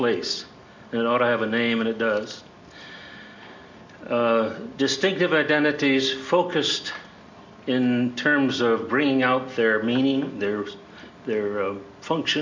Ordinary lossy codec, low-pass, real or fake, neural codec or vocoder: MP3, 48 kbps; 7.2 kHz; real; none